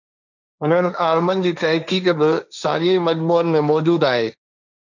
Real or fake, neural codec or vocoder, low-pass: fake; codec, 16 kHz, 1.1 kbps, Voila-Tokenizer; 7.2 kHz